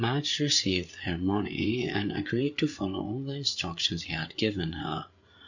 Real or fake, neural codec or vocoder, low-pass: fake; codec, 16 kHz, 8 kbps, FreqCodec, larger model; 7.2 kHz